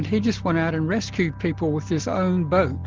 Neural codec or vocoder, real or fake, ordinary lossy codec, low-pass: none; real; Opus, 16 kbps; 7.2 kHz